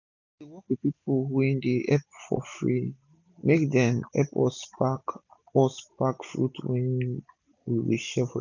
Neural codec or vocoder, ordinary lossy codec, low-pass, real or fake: none; none; 7.2 kHz; real